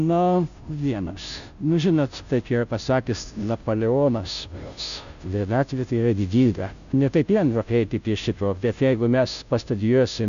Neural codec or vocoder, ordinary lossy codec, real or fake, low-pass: codec, 16 kHz, 0.5 kbps, FunCodec, trained on Chinese and English, 25 frames a second; MP3, 96 kbps; fake; 7.2 kHz